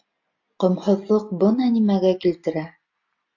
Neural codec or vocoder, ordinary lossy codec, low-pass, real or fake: none; AAC, 48 kbps; 7.2 kHz; real